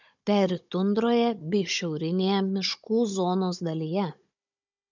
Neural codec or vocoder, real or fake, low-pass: codec, 16 kHz, 16 kbps, FunCodec, trained on Chinese and English, 50 frames a second; fake; 7.2 kHz